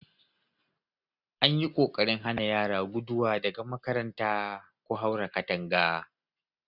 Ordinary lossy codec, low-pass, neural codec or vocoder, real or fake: none; 5.4 kHz; none; real